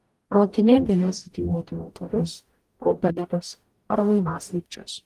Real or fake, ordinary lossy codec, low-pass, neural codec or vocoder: fake; Opus, 24 kbps; 14.4 kHz; codec, 44.1 kHz, 0.9 kbps, DAC